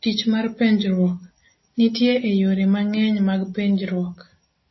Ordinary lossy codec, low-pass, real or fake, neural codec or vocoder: MP3, 24 kbps; 7.2 kHz; real; none